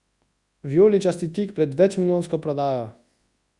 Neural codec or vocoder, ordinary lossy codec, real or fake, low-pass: codec, 24 kHz, 0.9 kbps, WavTokenizer, large speech release; Opus, 64 kbps; fake; 10.8 kHz